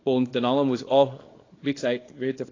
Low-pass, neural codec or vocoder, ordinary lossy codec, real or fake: 7.2 kHz; codec, 24 kHz, 0.9 kbps, WavTokenizer, small release; AAC, 32 kbps; fake